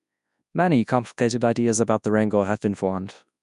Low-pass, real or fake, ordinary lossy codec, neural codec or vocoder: 10.8 kHz; fake; none; codec, 24 kHz, 0.9 kbps, WavTokenizer, large speech release